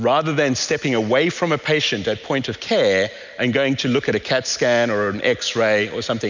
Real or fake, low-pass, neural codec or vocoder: real; 7.2 kHz; none